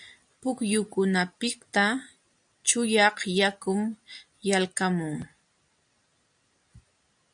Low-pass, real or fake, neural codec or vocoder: 9.9 kHz; real; none